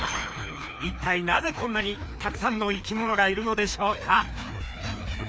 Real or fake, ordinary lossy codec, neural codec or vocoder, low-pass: fake; none; codec, 16 kHz, 2 kbps, FreqCodec, larger model; none